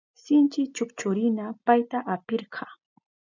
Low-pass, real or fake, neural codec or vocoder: 7.2 kHz; real; none